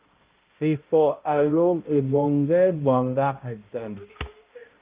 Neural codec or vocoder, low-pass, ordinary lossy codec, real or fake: codec, 16 kHz, 0.5 kbps, X-Codec, HuBERT features, trained on balanced general audio; 3.6 kHz; Opus, 24 kbps; fake